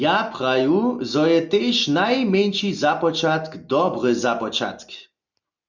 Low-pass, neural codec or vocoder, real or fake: 7.2 kHz; none; real